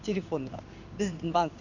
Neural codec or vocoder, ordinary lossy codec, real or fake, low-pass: codec, 16 kHz in and 24 kHz out, 1 kbps, XY-Tokenizer; none; fake; 7.2 kHz